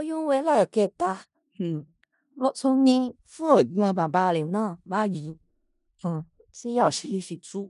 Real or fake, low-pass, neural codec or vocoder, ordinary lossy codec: fake; 10.8 kHz; codec, 16 kHz in and 24 kHz out, 0.4 kbps, LongCat-Audio-Codec, four codebook decoder; none